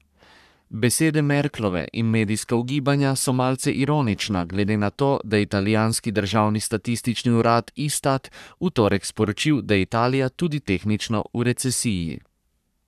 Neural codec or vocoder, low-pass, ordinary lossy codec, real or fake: codec, 44.1 kHz, 3.4 kbps, Pupu-Codec; 14.4 kHz; none; fake